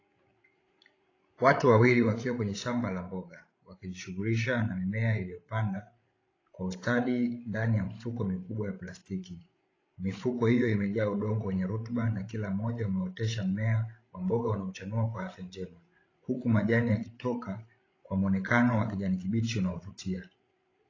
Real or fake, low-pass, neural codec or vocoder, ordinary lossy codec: fake; 7.2 kHz; codec, 16 kHz, 16 kbps, FreqCodec, larger model; AAC, 32 kbps